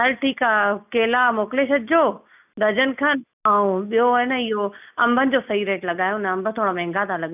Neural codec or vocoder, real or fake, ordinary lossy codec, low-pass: none; real; none; 3.6 kHz